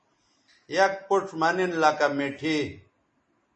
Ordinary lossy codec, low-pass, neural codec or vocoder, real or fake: MP3, 32 kbps; 10.8 kHz; none; real